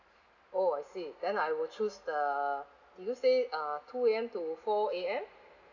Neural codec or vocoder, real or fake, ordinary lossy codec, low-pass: none; real; none; 7.2 kHz